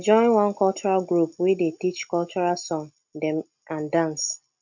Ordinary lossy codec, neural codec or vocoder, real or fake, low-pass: none; none; real; 7.2 kHz